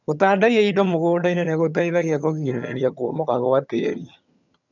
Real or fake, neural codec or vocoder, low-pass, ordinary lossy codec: fake; vocoder, 22.05 kHz, 80 mel bands, HiFi-GAN; 7.2 kHz; none